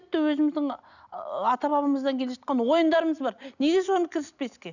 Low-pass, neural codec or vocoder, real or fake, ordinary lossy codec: 7.2 kHz; none; real; none